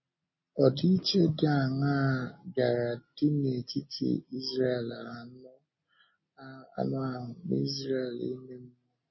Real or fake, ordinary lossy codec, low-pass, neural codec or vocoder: real; MP3, 24 kbps; 7.2 kHz; none